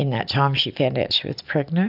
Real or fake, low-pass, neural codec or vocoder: real; 5.4 kHz; none